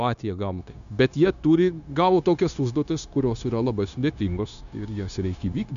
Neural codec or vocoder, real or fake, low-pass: codec, 16 kHz, 0.9 kbps, LongCat-Audio-Codec; fake; 7.2 kHz